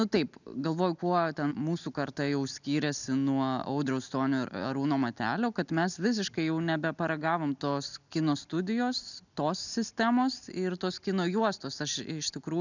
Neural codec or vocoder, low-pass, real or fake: none; 7.2 kHz; real